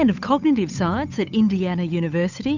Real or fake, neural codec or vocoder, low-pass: fake; codec, 16 kHz, 8 kbps, FunCodec, trained on Chinese and English, 25 frames a second; 7.2 kHz